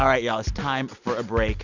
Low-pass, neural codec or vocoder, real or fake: 7.2 kHz; none; real